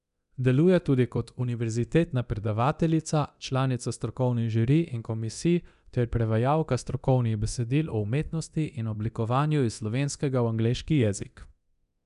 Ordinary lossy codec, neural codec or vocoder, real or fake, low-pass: none; codec, 24 kHz, 0.9 kbps, DualCodec; fake; 10.8 kHz